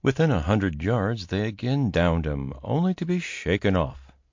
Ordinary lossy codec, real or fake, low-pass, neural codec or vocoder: MP3, 48 kbps; real; 7.2 kHz; none